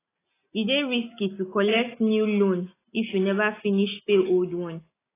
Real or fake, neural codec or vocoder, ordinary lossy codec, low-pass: real; none; AAC, 16 kbps; 3.6 kHz